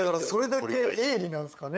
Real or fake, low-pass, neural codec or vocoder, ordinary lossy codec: fake; none; codec, 16 kHz, 8 kbps, FunCodec, trained on LibriTTS, 25 frames a second; none